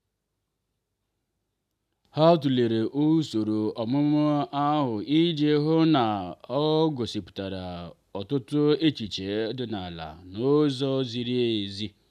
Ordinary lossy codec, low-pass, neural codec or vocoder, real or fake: none; 14.4 kHz; none; real